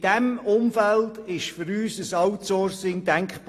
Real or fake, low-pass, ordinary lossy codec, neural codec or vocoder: real; 14.4 kHz; AAC, 48 kbps; none